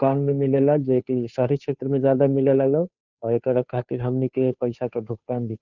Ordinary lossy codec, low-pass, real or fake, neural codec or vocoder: none; 7.2 kHz; fake; codec, 16 kHz, 2 kbps, FunCodec, trained on Chinese and English, 25 frames a second